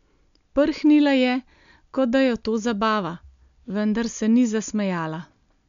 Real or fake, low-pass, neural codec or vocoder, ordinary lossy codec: real; 7.2 kHz; none; MP3, 64 kbps